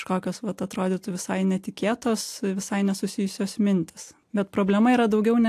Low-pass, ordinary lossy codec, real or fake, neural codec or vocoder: 14.4 kHz; AAC, 64 kbps; real; none